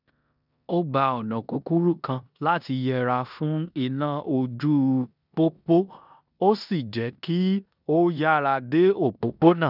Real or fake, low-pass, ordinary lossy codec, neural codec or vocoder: fake; 5.4 kHz; none; codec, 16 kHz in and 24 kHz out, 0.9 kbps, LongCat-Audio-Codec, four codebook decoder